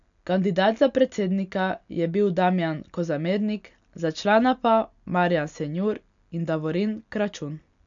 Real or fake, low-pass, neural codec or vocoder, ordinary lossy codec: real; 7.2 kHz; none; none